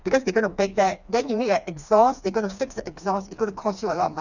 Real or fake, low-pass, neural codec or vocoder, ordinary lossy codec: fake; 7.2 kHz; codec, 16 kHz, 2 kbps, FreqCodec, smaller model; none